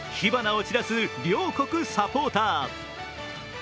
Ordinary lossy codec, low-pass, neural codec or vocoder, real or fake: none; none; none; real